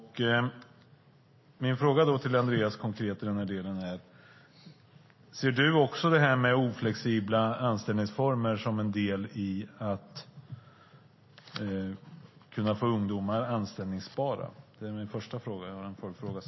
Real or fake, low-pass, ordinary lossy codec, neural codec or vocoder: real; 7.2 kHz; MP3, 24 kbps; none